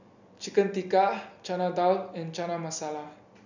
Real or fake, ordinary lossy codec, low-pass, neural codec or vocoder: real; none; 7.2 kHz; none